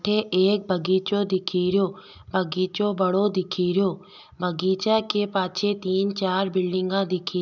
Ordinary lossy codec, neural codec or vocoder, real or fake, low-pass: none; none; real; 7.2 kHz